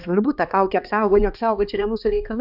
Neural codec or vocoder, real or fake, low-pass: codec, 16 kHz, 2 kbps, X-Codec, HuBERT features, trained on balanced general audio; fake; 5.4 kHz